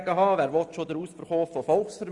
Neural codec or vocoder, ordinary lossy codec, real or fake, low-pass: none; Opus, 64 kbps; real; 10.8 kHz